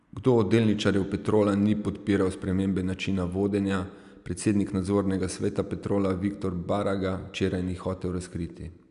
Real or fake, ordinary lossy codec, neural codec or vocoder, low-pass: real; none; none; 10.8 kHz